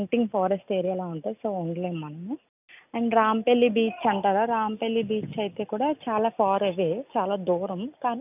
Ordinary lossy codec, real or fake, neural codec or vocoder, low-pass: none; real; none; 3.6 kHz